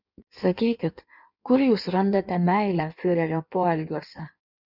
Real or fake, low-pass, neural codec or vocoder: fake; 5.4 kHz; codec, 16 kHz in and 24 kHz out, 1.1 kbps, FireRedTTS-2 codec